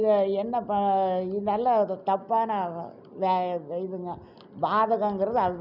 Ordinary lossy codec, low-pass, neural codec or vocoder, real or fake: none; 5.4 kHz; none; real